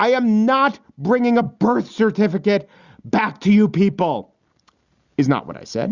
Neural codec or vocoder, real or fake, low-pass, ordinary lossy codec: none; real; 7.2 kHz; Opus, 64 kbps